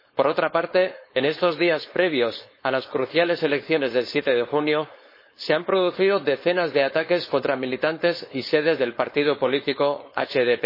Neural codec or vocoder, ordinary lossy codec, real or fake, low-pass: codec, 16 kHz, 4.8 kbps, FACodec; MP3, 24 kbps; fake; 5.4 kHz